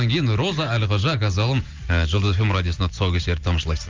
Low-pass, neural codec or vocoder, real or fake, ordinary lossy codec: 7.2 kHz; none; real; Opus, 32 kbps